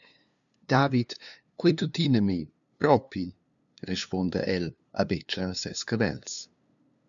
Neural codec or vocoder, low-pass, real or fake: codec, 16 kHz, 2 kbps, FunCodec, trained on LibriTTS, 25 frames a second; 7.2 kHz; fake